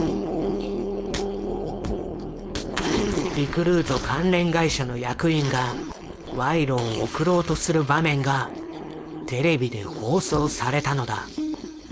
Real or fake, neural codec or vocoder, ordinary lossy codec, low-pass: fake; codec, 16 kHz, 4.8 kbps, FACodec; none; none